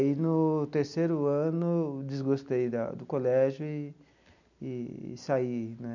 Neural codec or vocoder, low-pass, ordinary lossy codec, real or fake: none; 7.2 kHz; none; real